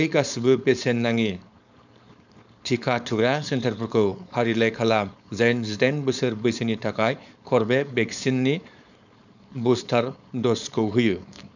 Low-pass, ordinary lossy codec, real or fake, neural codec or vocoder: 7.2 kHz; none; fake; codec, 16 kHz, 4.8 kbps, FACodec